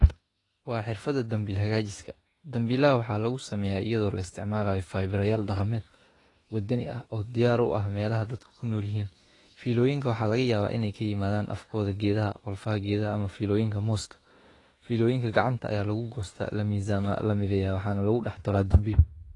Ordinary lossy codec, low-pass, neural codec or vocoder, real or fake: AAC, 32 kbps; 10.8 kHz; autoencoder, 48 kHz, 32 numbers a frame, DAC-VAE, trained on Japanese speech; fake